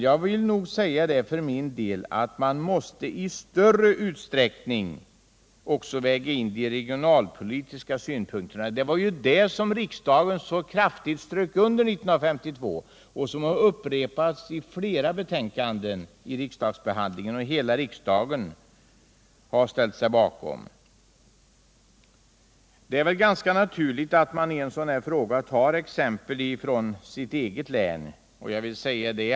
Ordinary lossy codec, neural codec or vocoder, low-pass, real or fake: none; none; none; real